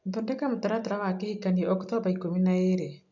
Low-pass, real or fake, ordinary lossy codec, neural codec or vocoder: 7.2 kHz; real; none; none